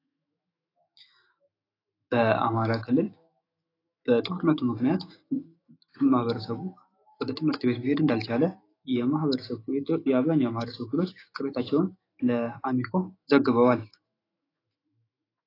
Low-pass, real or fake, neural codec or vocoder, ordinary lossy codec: 5.4 kHz; fake; autoencoder, 48 kHz, 128 numbers a frame, DAC-VAE, trained on Japanese speech; AAC, 24 kbps